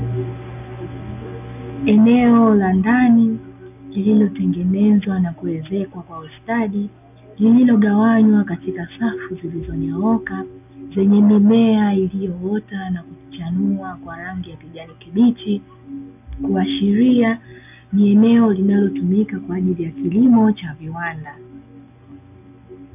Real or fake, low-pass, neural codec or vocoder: real; 3.6 kHz; none